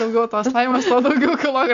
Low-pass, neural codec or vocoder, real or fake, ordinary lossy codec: 7.2 kHz; none; real; AAC, 64 kbps